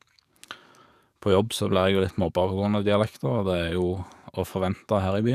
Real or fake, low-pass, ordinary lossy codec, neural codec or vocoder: fake; 14.4 kHz; none; codec, 44.1 kHz, 7.8 kbps, DAC